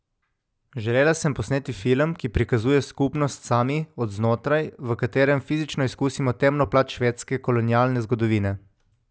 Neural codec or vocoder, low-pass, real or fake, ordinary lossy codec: none; none; real; none